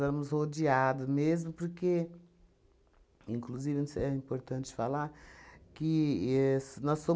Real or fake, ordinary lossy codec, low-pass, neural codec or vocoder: real; none; none; none